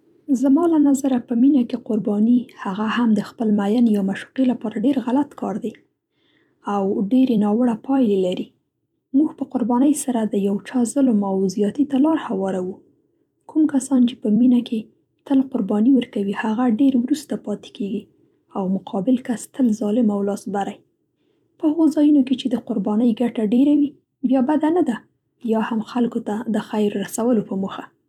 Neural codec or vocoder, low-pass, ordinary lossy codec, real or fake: vocoder, 44.1 kHz, 128 mel bands every 256 samples, BigVGAN v2; 19.8 kHz; none; fake